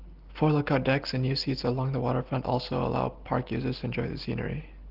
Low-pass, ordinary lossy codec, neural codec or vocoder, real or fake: 5.4 kHz; Opus, 16 kbps; none; real